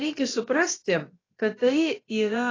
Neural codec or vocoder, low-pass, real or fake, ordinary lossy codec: codec, 16 kHz, about 1 kbps, DyCAST, with the encoder's durations; 7.2 kHz; fake; AAC, 32 kbps